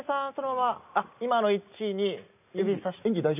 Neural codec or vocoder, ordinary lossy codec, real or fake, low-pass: none; none; real; 3.6 kHz